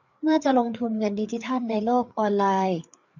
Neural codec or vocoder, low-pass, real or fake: codec, 16 kHz, 4 kbps, FreqCodec, larger model; 7.2 kHz; fake